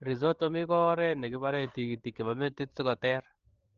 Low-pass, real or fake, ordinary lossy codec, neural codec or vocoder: 7.2 kHz; fake; Opus, 16 kbps; codec, 16 kHz, 8 kbps, FreqCodec, larger model